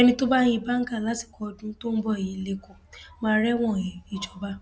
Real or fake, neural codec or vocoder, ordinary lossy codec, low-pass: real; none; none; none